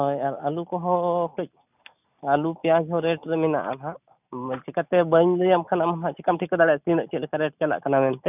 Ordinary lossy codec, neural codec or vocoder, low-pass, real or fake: none; none; 3.6 kHz; real